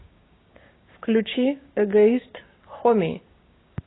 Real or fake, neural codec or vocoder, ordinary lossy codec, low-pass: fake; codec, 16 kHz, 6 kbps, DAC; AAC, 16 kbps; 7.2 kHz